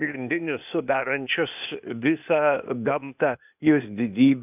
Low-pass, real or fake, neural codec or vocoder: 3.6 kHz; fake; codec, 16 kHz, 0.8 kbps, ZipCodec